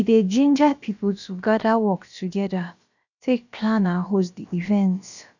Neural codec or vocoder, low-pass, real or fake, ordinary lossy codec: codec, 16 kHz, about 1 kbps, DyCAST, with the encoder's durations; 7.2 kHz; fake; none